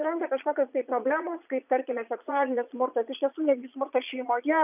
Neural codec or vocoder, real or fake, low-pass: vocoder, 22.05 kHz, 80 mel bands, Vocos; fake; 3.6 kHz